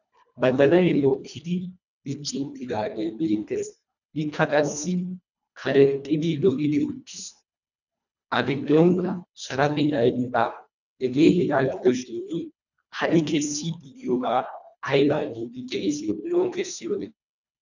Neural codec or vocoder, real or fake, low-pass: codec, 24 kHz, 1.5 kbps, HILCodec; fake; 7.2 kHz